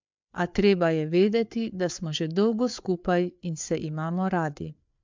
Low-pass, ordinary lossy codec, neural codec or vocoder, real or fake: 7.2 kHz; MP3, 64 kbps; codec, 16 kHz, 4 kbps, FreqCodec, larger model; fake